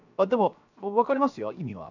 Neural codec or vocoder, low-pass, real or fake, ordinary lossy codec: codec, 16 kHz, 0.7 kbps, FocalCodec; 7.2 kHz; fake; none